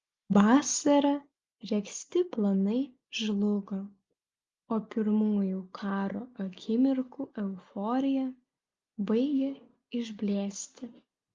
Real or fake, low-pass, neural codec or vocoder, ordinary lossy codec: real; 7.2 kHz; none; Opus, 32 kbps